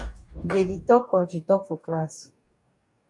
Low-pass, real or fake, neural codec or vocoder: 10.8 kHz; fake; codec, 44.1 kHz, 2.6 kbps, DAC